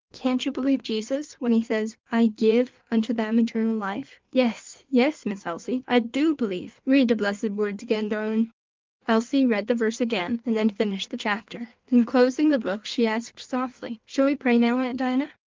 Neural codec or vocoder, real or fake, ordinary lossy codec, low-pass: codec, 16 kHz in and 24 kHz out, 1.1 kbps, FireRedTTS-2 codec; fake; Opus, 32 kbps; 7.2 kHz